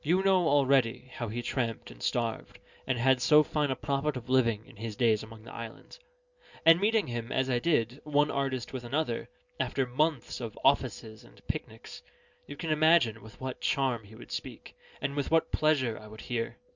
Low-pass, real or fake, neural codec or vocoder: 7.2 kHz; real; none